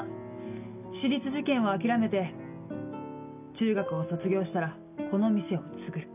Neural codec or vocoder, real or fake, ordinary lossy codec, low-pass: none; real; none; 3.6 kHz